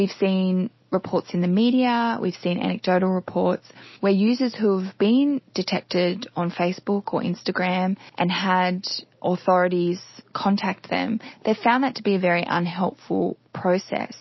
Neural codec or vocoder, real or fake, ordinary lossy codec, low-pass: none; real; MP3, 24 kbps; 7.2 kHz